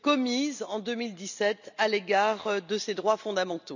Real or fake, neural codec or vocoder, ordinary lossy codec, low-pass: real; none; none; 7.2 kHz